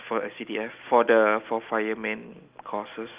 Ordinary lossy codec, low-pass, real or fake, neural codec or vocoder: Opus, 32 kbps; 3.6 kHz; real; none